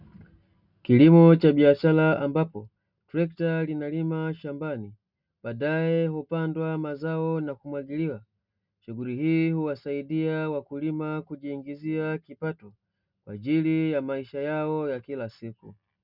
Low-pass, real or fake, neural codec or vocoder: 5.4 kHz; real; none